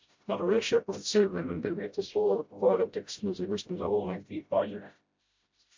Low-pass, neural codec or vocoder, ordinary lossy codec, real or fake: 7.2 kHz; codec, 16 kHz, 0.5 kbps, FreqCodec, smaller model; MP3, 48 kbps; fake